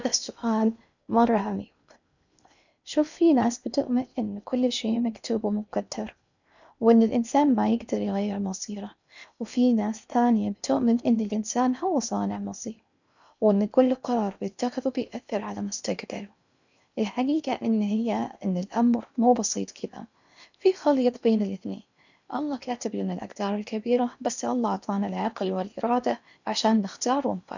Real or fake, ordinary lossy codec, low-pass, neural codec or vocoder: fake; none; 7.2 kHz; codec, 16 kHz in and 24 kHz out, 0.8 kbps, FocalCodec, streaming, 65536 codes